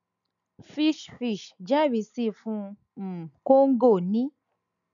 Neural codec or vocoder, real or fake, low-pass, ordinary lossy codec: none; real; 7.2 kHz; none